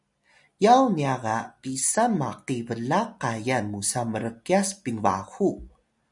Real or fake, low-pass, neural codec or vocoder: real; 10.8 kHz; none